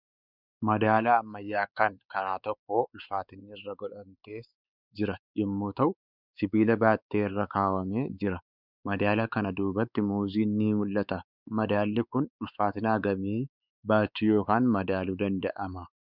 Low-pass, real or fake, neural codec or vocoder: 5.4 kHz; fake; codec, 16 kHz, 4 kbps, X-Codec, WavLM features, trained on Multilingual LibriSpeech